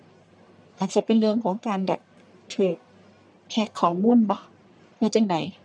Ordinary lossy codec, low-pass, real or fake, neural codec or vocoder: none; 9.9 kHz; fake; codec, 44.1 kHz, 1.7 kbps, Pupu-Codec